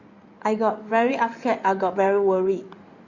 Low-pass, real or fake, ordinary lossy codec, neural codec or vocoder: 7.2 kHz; fake; Opus, 64 kbps; codec, 16 kHz in and 24 kHz out, 2.2 kbps, FireRedTTS-2 codec